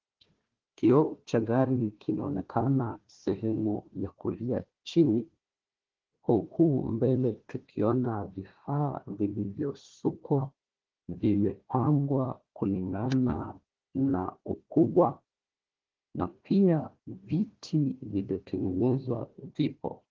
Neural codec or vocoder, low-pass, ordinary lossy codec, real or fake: codec, 16 kHz, 1 kbps, FunCodec, trained on Chinese and English, 50 frames a second; 7.2 kHz; Opus, 16 kbps; fake